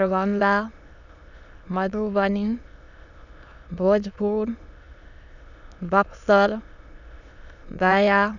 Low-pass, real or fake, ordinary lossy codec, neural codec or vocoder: 7.2 kHz; fake; none; autoencoder, 22.05 kHz, a latent of 192 numbers a frame, VITS, trained on many speakers